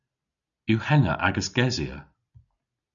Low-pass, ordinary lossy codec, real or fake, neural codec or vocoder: 7.2 kHz; MP3, 96 kbps; real; none